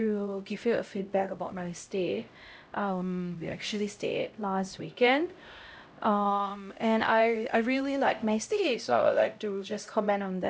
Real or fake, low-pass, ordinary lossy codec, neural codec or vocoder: fake; none; none; codec, 16 kHz, 0.5 kbps, X-Codec, HuBERT features, trained on LibriSpeech